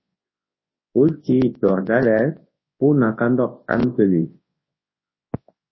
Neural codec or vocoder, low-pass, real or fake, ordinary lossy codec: codec, 24 kHz, 0.9 kbps, WavTokenizer, large speech release; 7.2 kHz; fake; MP3, 24 kbps